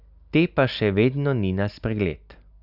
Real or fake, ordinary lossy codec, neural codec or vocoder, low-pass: real; none; none; 5.4 kHz